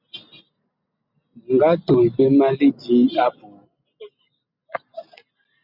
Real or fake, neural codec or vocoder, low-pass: real; none; 5.4 kHz